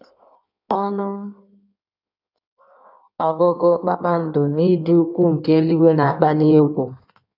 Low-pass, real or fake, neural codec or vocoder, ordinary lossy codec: 5.4 kHz; fake; codec, 16 kHz in and 24 kHz out, 1.1 kbps, FireRedTTS-2 codec; none